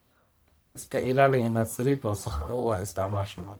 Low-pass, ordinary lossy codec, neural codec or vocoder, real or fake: none; none; codec, 44.1 kHz, 1.7 kbps, Pupu-Codec; fake